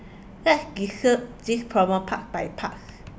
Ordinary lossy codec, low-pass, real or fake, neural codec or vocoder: none; none; real; none